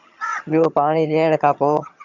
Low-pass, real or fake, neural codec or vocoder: 7.2 kHz; fake; vocoder, 22.05 kHz, 80 mel bands, HiFi-GAN